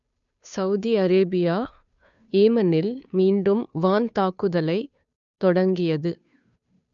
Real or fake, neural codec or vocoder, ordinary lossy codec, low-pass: fake; codec, 16 kHz, 2 kbps, FunCodec, trained on Chinese and English, 25 frames a second; none; 7.2 kHz